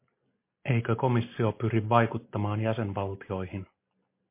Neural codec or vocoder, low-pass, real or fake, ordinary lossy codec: none; 3.6 kHz; real; MP3, 24 kbps